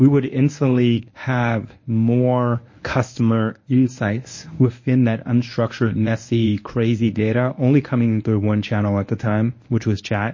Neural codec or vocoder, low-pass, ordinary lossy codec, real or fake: codec, 24 kHz, 0.9 kbps, WavTokenizer, medium speech release version 1; 7.2 kHz; MP3, 32 kbps; fake